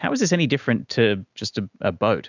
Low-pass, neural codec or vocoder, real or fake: 7.2 kHz; none; real